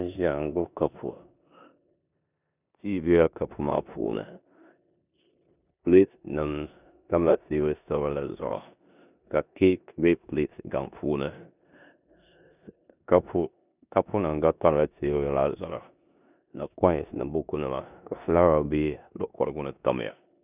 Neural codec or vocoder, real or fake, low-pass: codec, 16 kHz in and 24 kHz out, 0.9 kbps, LongCat-Audio-Codec, four codebook decoder; fake; 3.6 kHz